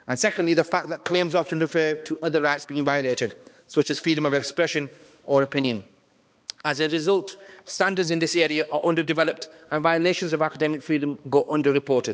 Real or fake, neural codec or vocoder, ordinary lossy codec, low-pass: fake; codec, 16 kHz, 2 kbps, X-Codec, HuBERT features, trained on balanced general audio; none; none